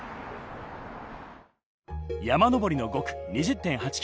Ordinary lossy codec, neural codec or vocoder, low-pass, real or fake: none; none; none; real